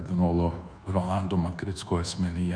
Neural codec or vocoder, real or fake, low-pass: codec, 24 kHz, 1.2 kbps, DualCodec; fake; 9.9 kHz